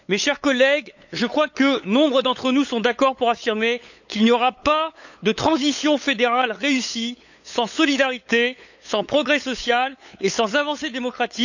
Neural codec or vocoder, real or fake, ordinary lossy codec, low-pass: codec, 16 kHz, 8 kbps, FunCodec, trained on LibriTTS, 25 frames a second; fake; none; 7.2 kHz